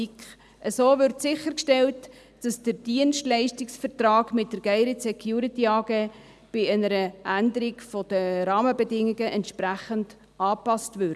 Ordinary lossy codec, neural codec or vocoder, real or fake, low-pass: none; none; real; none